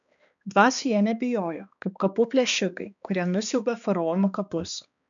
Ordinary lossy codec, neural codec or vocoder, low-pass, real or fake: MP3, 96 kbps; codec, 16 kHz, 2 kbps, X-Codec, HuBERT features, trained on balanced general audio; 7.2 kHz; fake